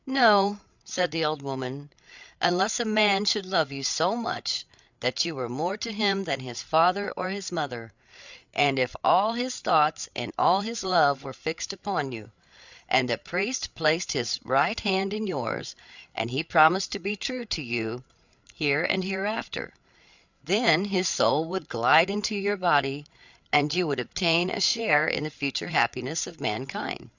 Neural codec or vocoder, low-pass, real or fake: codec, 16 kHz, 8 kbps, FreqCodec, larger model; 7.2 kHz; fake